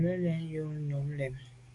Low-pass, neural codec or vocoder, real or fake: 10.8 kHz; codec, 44.1 kHz, 7.8 kbps, DAC; fake